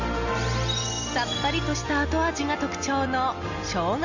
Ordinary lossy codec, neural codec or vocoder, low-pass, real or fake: Opus, 64 kbps; none; 7.2 kHz; real